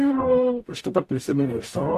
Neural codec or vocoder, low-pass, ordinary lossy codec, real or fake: codec, 44.1 kHz, 0.9 kbps, DAC; 14.4 kHz; AAC, 64 kbps; fake